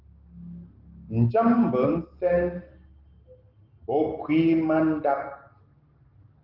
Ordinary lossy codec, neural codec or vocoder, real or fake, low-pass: Opus, 16 kbps; none; real; 5.4 kHz